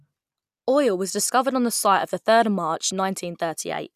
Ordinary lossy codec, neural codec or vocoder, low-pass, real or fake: none; none; 14.4 kHz; real